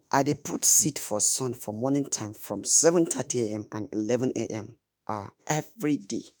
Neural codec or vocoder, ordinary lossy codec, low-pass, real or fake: autoencoder, 48 kHz, 32 numbers a frame, DAC-VAE, trained on Japanese speech; none; none; fake